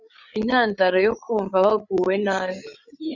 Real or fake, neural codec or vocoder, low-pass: fake; codec, 16 kHz, 8 kbps, FreqCodec, larger model; 7.2 kHz